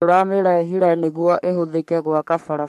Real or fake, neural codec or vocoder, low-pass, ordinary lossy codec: fake; codec, 44.1 kHz, 3.4 kbps, Pupu-Codec; 14.4 kHz; MP3, 64 kbps